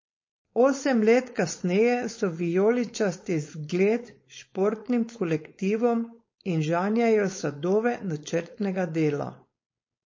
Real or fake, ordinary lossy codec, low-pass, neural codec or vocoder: fake; MP3, 32 kbps; 7.2 kHz; codec, 16 kHz, 4.8 kbps, FACodec